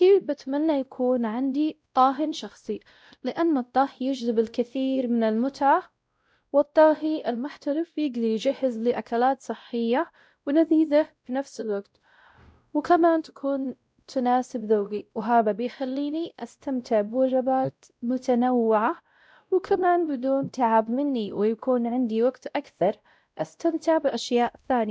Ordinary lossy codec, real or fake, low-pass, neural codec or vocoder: none; fake; none; codec, 16 kHz, 0.5 kbps, X-Codec, WavLM features, trained on Multilingual LibriSpeech